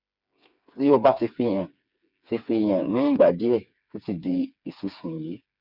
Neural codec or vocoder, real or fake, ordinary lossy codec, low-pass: codec, 16 kHz, 4 kbps, FreqCodec, smaller model; fake; none; 5.4 kHz